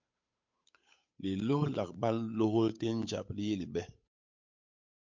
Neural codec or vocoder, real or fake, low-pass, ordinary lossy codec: codec, 16 kHz, 8 kbps, FunCodec, trained on Chinese and English, 25 frames a second; fake; 7.2 kHz; MP3, 48 kbps